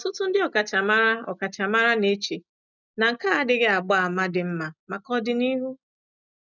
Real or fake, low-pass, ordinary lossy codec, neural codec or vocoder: real; 7.2 kHz; none; none